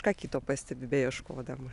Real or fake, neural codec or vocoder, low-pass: real; none; 10.8 kHz